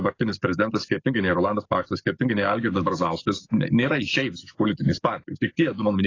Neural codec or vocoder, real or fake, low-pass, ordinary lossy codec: codec, 44.1 kHz, 7.8 kbps, DAC; fake; 7.2 kHz; AAC, 32 kbps